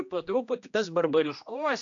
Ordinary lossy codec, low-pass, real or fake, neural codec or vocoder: AAC, 64 kbps; 7.2 kHz; fake; codec, 16 kHz, 1 kbps, X-Codec, HuBERT features, trained on general audio